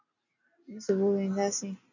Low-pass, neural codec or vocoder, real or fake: 7.2 kHz; none; real